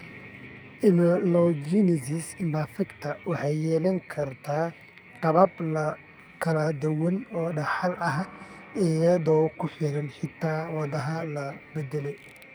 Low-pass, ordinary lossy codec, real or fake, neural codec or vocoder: none; none; fake; codec, 44.1 kHz, 2.6 kbps, SNAC